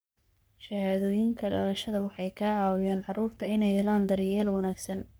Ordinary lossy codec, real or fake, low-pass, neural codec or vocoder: none; fake; none; codec, 44.1 kHz, 3.4 kbps, Pupu-Codec